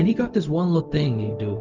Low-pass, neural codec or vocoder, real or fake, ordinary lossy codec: 7.2 kHz; codec, 16 kHz, 0.4 kbps, LongCat-Audio-Codec; fake; Opus, 24 kbps